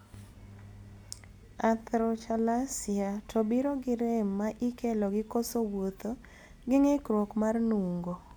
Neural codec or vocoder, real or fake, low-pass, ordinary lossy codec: none; real; none; none